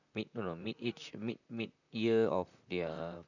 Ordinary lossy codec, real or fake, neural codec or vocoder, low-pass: none; fake; vocoder, 44.1 kHz, 128 mel bands, Pupu-Vocoder; 7.2 kHz